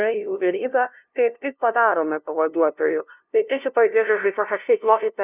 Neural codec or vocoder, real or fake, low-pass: codec, 16 kHz, 0.5 kbps, FunCodec, trained on LibriTTS, 25 frames a second; fake; 3.6 kHz